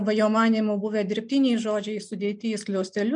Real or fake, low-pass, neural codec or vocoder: real; 9.9 kHz; none